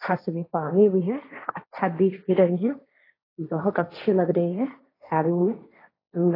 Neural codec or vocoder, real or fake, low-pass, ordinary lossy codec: codec, 16 kHz, 1.1 kbps, Voila-Tokenizer; fake; 5.4 kHz; AAC, 24 kbps